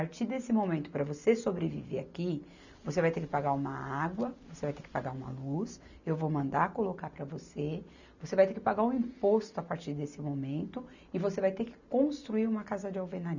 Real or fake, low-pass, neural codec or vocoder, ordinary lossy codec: real; 7.2 kHz; none; none